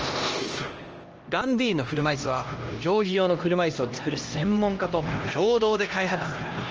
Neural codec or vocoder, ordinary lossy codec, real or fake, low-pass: codec, 16 kHz, 1 kbps, X-Codec, HuBERT features, trained on LibriSpeech; Opus, 24 kbps; fake; 7.2 kHz